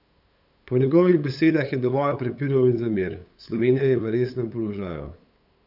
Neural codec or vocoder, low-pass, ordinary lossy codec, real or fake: codec, 16 kHz, 8 kbps, FunCodec, trained on LibriTTS, 25 frames a second; 5.4 kHz; none; fake